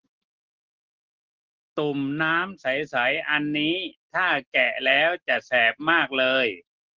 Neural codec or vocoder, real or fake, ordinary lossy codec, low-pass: none; real; Opus, 16 kbps; 7.2 kHz